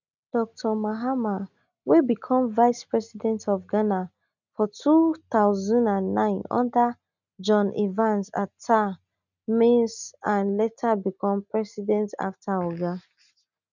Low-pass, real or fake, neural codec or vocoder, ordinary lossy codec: 7.2 kHz; real; none; none